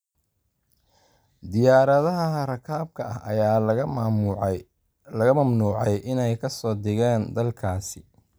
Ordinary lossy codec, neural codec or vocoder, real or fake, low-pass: none; none; real; none